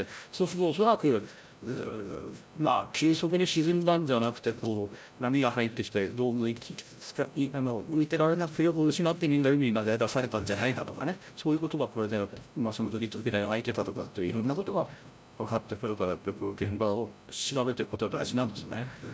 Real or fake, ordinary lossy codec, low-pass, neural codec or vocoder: fake; none; none; codec, 16 kHz, 0.5 kbps, FreqCodec, larger model